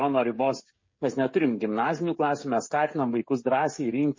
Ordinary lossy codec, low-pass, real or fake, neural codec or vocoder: MP3, 32 kbps; 7.2 kHz; fake; codec, 16 kHz, 16 kbps, FreqCodec, smaller model